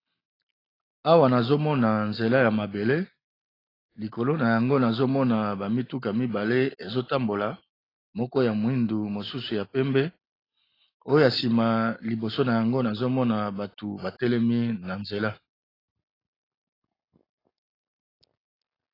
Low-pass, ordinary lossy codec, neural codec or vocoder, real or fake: 5.4 kHz; AAC, 24 kbps; none; real